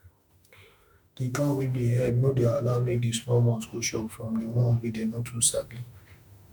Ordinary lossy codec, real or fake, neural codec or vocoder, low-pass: none; fake; autoencoder, 48 kHz, 32 numbers a frame, DAC-VAE, trained on Japanese speech; none